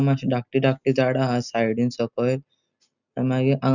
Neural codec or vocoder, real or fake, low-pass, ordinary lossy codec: none; real; 7.2 kHz; none